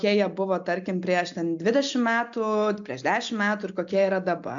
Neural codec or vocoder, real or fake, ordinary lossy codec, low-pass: none; real; AAC, 64 kbps; 7.2 kHz